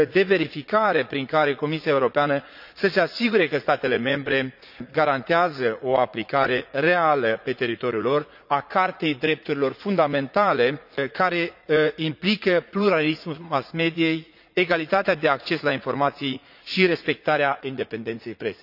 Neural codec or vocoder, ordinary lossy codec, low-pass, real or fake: vocoder, 44.1 kHz, 80 mel bands, Vocos; none; 5.4 kHz; fake